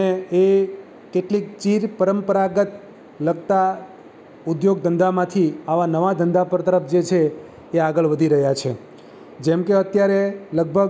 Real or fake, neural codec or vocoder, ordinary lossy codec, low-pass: real; none; none; none